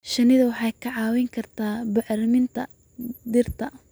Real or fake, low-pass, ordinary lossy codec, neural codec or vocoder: real; none; none; none